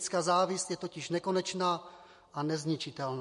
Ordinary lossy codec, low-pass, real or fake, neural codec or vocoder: MP3, 48 kbps; 10.8 kHz; real; none